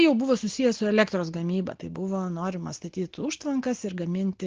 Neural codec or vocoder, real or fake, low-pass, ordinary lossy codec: none; real; 7.2 kHz; Opus, 16 kbps